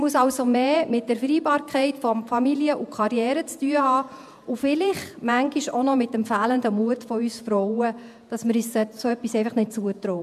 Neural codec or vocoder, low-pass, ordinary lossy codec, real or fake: vocoder, 48 kHz, 128 mel bands, Vocos; 14.4 kHz; MP3, 96 kbps; fake